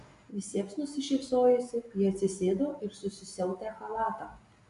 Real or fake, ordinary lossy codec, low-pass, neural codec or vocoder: real; AAC, 64 kbps; 10.8 kHz; none